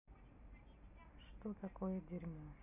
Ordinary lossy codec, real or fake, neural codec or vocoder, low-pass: none; real; none; 3.6 kHz